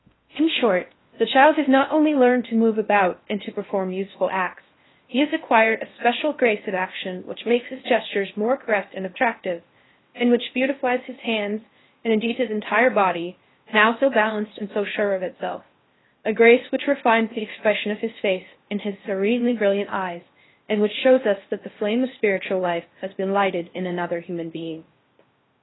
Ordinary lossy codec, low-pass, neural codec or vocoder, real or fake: AAC, 16 kbps; 7.2 kHz; codec, 16 kHz in and 24 kHz out, 0.6 kbps, FocalCodec, streaming, 4096 codes; fake